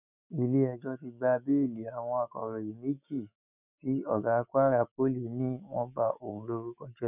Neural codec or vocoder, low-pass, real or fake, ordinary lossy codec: autoencoder, 48 kHz, 128 numbers a frame, DAC-VAE, trained on Japanese speech; 3.6 kHz; fake; none